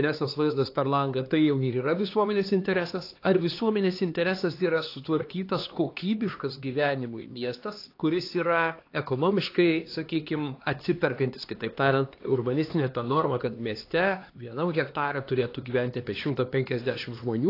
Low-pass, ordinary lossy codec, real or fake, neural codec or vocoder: 5.4 kHz; AAC, 32 kbps; fake; codec, 16 kHz, 2 kbps, X-Codec, HuBERT features, trained on LibriSpeech